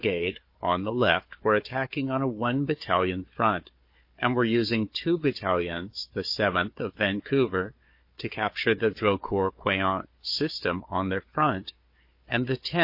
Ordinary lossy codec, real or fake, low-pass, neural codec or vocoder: MP3, 32 kbps; fake; 5.4 kHz; codec, 16 kHz, 4 kbps, FunCodec, trained on Chinese and English, 50 frames a second